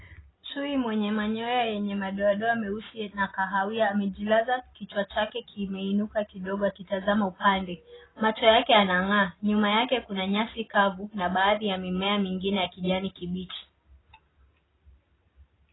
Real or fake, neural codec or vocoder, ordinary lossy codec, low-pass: real; none; AAC, 16 kbps; 7.2 kHz